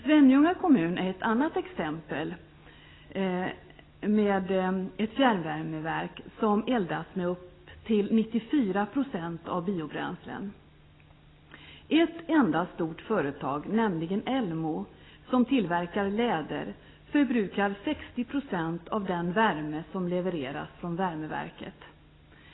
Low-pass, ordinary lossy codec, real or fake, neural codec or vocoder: 7.2 kHz; AAC, 16 kbps; real; none